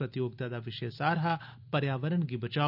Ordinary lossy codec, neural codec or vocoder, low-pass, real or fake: none; none; 5.4 kHz; real